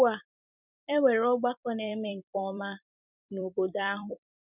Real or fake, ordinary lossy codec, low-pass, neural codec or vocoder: fake; none; 3.6 kHz; vocoder, 44.1 kHz, 128 mel bands every 256 samples, BigVGAN v2